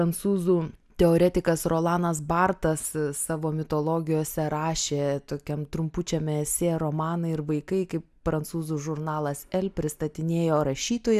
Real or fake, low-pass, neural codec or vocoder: real; 14.4 kHz; none